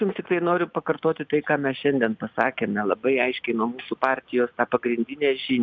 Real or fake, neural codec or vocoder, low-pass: real; none; 7.2 kHz